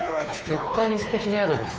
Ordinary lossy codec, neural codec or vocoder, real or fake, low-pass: none; codec, 16 kHz, 4 kbps, X-Codec, WavLM features, trained on Multilingual LibriSpeech; fake; none